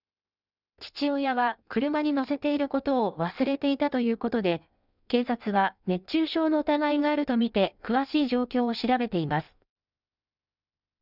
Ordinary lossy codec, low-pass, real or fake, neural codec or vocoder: none; 5.4 kHz; fake; codec, 16 kHz in and 24 kHz out, 1.1 kbps, FireRedTTS-2 codec